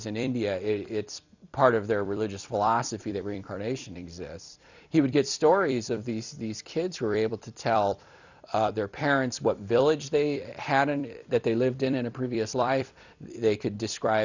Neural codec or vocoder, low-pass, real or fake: vocoder, 44.1 kHz, 128 mel bands every 256 samples, BigVGAN v2; 7.2 kHz; fake